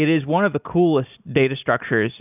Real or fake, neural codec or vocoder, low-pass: real; none; 3.6 kHz